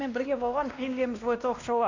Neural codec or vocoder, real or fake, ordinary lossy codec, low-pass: codec, 16 kHz, 1 kbps, X-Codec, WavLM features, trained on Multilingual LibriSpeech; fake; none; 7.2 kHz